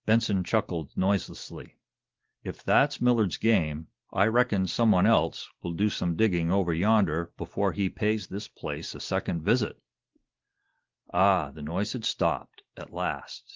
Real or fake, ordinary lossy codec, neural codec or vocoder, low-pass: real; Opus, 16 kbps; none; 7.2 kHz